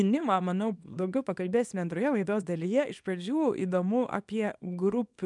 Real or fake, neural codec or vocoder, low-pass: fake; codec, 24 kHz, 0.9 kbps, WavTokenizer, small release; 10.8 kHz